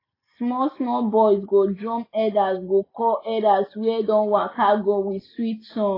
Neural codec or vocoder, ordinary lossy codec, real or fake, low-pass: none; AAC, 24 kbps; real; 5.4 kHz